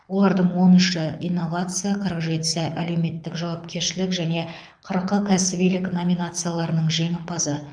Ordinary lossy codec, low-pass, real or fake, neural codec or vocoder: none; 9.9 kHz; fake; codec, 24 kHz, 6 kbps, HILCodec